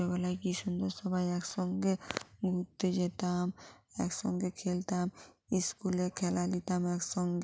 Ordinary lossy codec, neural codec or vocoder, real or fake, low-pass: none; none; real; none